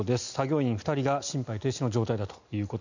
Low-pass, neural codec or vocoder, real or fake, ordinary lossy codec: 7.2 kHz; none; real; none